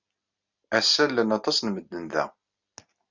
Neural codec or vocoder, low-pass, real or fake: none; 7.2 kHz; real